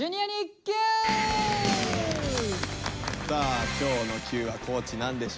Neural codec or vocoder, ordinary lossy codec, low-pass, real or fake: none; none; none; real